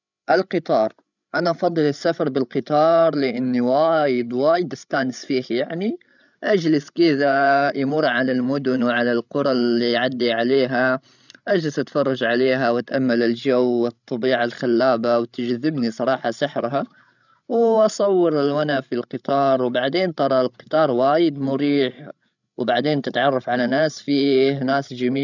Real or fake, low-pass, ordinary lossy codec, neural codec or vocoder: fake; 7.2 kHz; none; codec, 16 kHz, 16 kbps, FreqCodec, larger model